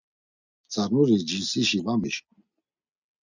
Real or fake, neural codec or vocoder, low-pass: real; none; 7.2 kHz